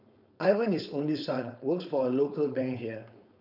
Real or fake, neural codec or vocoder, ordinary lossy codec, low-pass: fake; codec, 16 kHz, 4.8 kbps, FACodec; none; 5.4 kHz